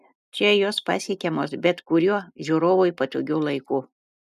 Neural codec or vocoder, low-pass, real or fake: none; 14.4 kHz; real